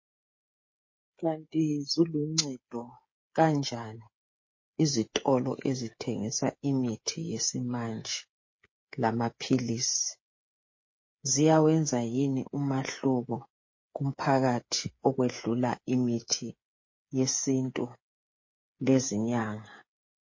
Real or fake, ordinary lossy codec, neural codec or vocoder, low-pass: fake; MP3, 32 kbps; codec, 16 kHz, 8 kbps, FreqCodec, smaller model; 7.2 kHz